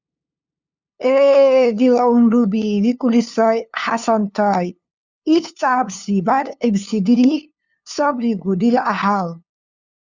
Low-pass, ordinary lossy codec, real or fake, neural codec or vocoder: 7.2 kHz; Opus, 64 kbps; fake; codec, 16 kHz, 2 kbps, FunCodec, trained on LibriTTS, 25 frames a second